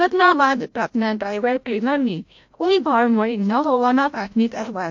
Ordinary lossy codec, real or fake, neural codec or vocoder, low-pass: MP3, 48 kbps; fake; codec, 16 kHz, 0.5 kbps, FreqCodec, larger model; 7.2 kHz